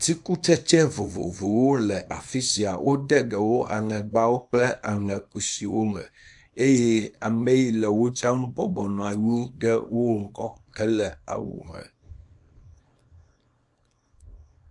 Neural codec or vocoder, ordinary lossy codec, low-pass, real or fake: codec, 24 kHz, 0.9 kbps, WavTokenizer, small release; AAC, 64 kbps; 10.8 kHz; fake